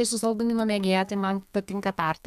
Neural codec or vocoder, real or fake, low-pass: codec, 32 kHz, 1.9 kbps, SNAC; fake; 14.4 kHz